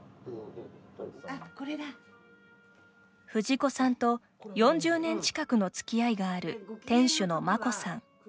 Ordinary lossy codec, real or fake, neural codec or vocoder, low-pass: none; real; none; none